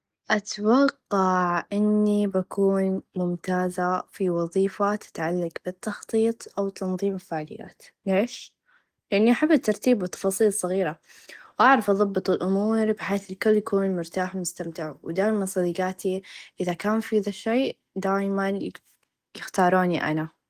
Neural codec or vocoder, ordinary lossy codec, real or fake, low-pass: none; Opus, 24 kbps; real; 14.4 kHz